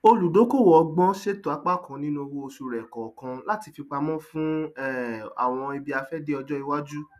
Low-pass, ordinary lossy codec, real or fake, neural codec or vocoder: 14.4 kHz; none; real; none